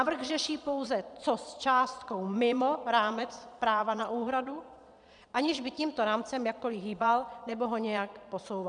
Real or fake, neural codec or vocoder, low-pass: fake; vocoder, 22.05 kHz, 80 mel bands, Vocos; 9.9 kHz